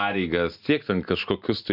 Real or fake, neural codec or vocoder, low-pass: real; none; 5.4 kHz